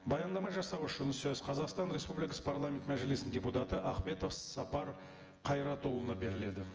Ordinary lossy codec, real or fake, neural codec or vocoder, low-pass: Opus, 24 kbps; fake; vocoder, 24 kHz, 100 mel bands, Vocos; 7.2 kHz